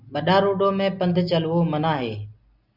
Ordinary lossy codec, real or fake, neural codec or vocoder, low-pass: Opus, 64 kbps; real; none; 5.4 kHz